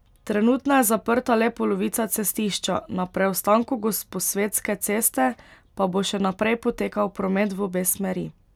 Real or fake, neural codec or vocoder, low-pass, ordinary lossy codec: real; none; 19.8 kHz; none